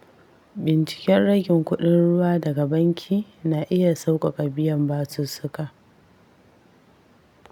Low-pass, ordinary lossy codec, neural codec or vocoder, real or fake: 19.8 kHz; none; none; real